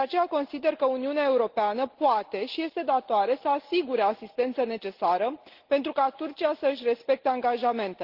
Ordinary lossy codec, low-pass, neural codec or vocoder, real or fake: Opus, 16 kbps; 5.4 kHz; none; real